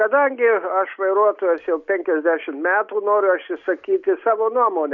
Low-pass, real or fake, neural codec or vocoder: 7.2 kHz; real; none